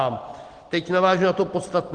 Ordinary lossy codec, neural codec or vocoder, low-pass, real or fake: Opus, 16 kbps; none; 9.9 kHz; real